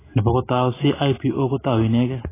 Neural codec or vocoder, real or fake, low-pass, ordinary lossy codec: none; real; 3.6 kHz; AAC, 16 kbps